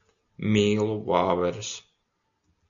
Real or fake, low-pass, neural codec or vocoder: real; 7.2 kHz; none